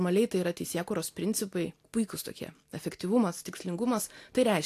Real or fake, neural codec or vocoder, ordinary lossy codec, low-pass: real; none; AAC, 64 kbps; 14.4 kHz